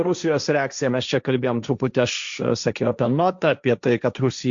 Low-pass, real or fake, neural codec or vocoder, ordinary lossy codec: 7.2 kHz; fake; codec, 16 kHz, 1.1 kbps, Voila-Tokenizer; Opus, 64 kbps